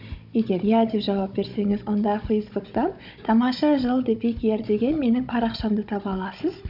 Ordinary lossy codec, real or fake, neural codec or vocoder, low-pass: none; fake; codec, 16 kHz, 16 kbps, FunCodec, trained on Chinese and English, 50 frames a second; 5.4 kHz